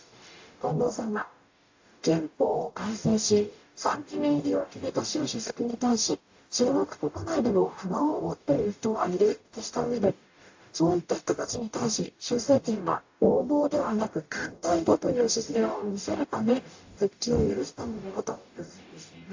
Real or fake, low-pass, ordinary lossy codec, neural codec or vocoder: fake; 7.2 kHz; AAC, 48 kbps; codec, 44.1 kHz, 0.9 kbps, DAC